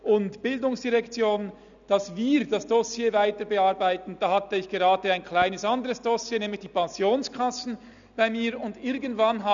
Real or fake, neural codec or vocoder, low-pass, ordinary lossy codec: real; none; 7.2 kHz; none